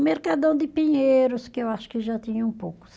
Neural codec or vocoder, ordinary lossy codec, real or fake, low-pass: none; none; real; none